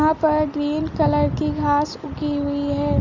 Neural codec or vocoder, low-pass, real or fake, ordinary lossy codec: none; 7.2 kHz; real; Opus, 64 kbps